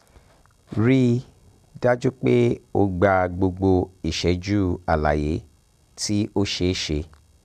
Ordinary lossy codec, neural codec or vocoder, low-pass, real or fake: none; none; 14.4 kHz; real